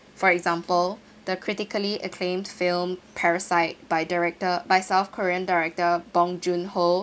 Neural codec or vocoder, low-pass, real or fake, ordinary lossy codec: none; none; real; none